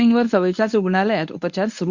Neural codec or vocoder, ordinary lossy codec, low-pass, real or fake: codec, 24 kHz, 0.9 kbps, WavTokenizer, medium speech release version 1; none; 7.2 kHz; fake